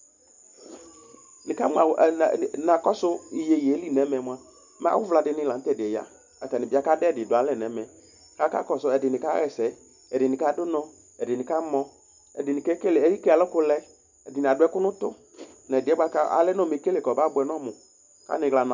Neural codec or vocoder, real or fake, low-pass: none; real; 7.2 kHz